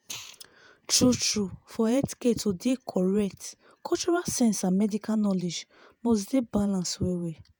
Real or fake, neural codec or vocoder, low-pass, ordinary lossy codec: fake; vocoder, 48 kHz, 128 mel bands, Vocos; none; none